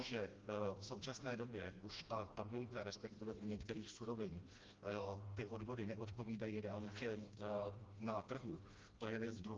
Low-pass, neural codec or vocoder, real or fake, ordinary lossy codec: 7.2 kHz; codec, 16 kHz, 1 kbps, FreqCodec, smaller model; fake; Opus, 32 kbps